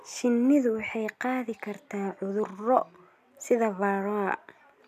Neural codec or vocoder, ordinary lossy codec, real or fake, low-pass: none; none; real; 14.4 kHz